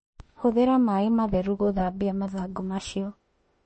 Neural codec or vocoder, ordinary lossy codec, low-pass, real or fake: autoencoder, 48 kHz, 32 numbers a frame, DAC-VAE, trained on Japanese speech; MP3, 32 kbps; 10.8 kHz; fake